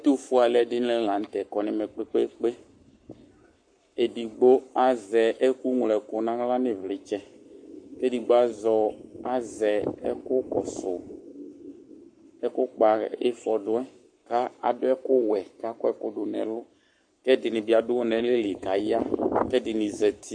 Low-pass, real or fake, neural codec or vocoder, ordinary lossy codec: 9.9 kHz; fake; codec, 44.1 kHz, 7.8 kbps, Pupu-Codec; MP3, 48 kbps